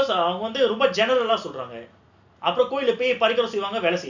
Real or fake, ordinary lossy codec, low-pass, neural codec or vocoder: real; none; 7.2 kHz; none